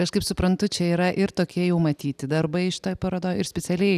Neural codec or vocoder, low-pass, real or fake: none; 14.4 kHz; real